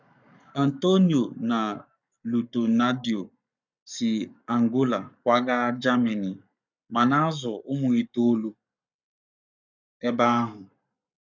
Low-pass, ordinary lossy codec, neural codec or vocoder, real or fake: 7.2 kHz; none; codec, 44.1 kHz, 7.8 kbps, Pupu-Codec; fake